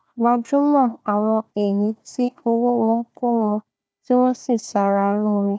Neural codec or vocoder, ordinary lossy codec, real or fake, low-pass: codec, 16 kHz, 1 kbps, FunCodec, trained on Chinese and English, 50 frames a second; none; fake; none